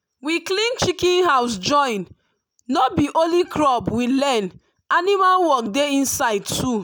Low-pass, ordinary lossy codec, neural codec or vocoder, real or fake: none; none; none; real